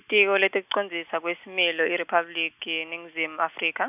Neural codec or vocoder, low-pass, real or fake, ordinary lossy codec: none; 3.6 kHz; real; none